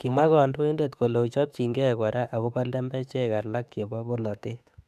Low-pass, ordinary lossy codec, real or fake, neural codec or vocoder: 14.4 kHz; none; fake; autoencoder, 48 kHz, 32 numbers a frame, DAC-VAE, trained on Japanese speech